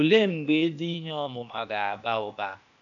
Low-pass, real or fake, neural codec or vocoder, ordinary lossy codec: 7.2 kHz; fake; codec, 16 kHz, 0.8 kbps, ZipCodec; none